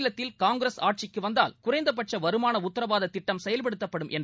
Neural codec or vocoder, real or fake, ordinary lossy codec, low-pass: none; real; none; none